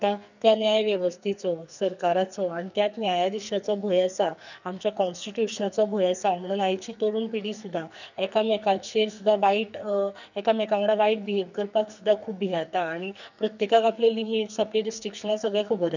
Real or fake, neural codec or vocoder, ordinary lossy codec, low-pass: fake; codec, 44.1 kHz, 2.6 kbps, SNAC; none; 7.2 kHz